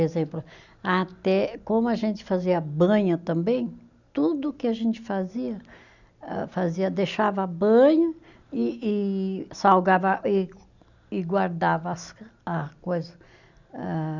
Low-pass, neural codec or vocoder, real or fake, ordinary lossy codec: 7.2 kHz; none; real; none